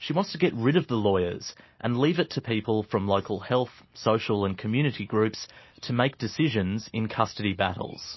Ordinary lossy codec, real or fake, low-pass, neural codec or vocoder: MP3, 24 kbps; real; 7.2 kHz; none